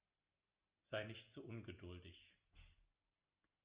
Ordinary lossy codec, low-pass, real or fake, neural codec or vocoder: Opus, 64 kbps; 3.6 kHz; real; none